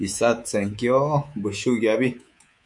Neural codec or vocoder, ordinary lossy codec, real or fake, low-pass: codec, 24 kHz, 3.1 kbps, DualCodec; MP3, 64 kbps; fake; 10.8 kHz